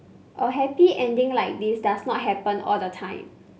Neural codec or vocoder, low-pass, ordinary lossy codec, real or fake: none; none; none; real